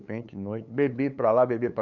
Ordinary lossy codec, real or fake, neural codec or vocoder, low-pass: none; fake; codec, 16 kHz, 8 kbps, FunCodec, trained on LibriTTS, 25 frames a second; 7.2 kHz